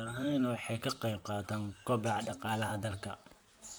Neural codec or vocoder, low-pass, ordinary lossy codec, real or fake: vocoder, 44.1 kHz, 128 mel bands, Pupu-Vocoder; none; none; fake